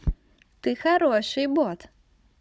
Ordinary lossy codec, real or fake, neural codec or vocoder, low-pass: none; fake; codec, 16 kHz, 16 kbps, FunCodec, trained on Chinese and English, 50 frames a second; none